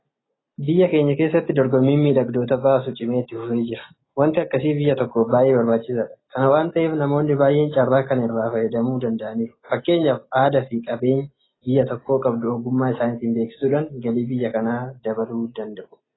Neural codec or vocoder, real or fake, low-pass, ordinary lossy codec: none; real; 7.2 kHz; AAC, 16 kbps